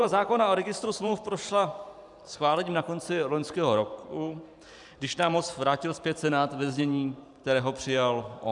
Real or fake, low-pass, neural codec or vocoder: fake; 10.8 kHz; vocoder, 44.1 kHz, 128 mel bands every 512 samples, BigVGAN v2